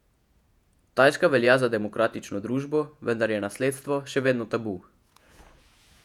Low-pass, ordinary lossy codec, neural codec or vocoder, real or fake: 19.8 kHz; none; vocoder, 48 kHz, 128 mel bands, Vocos; fake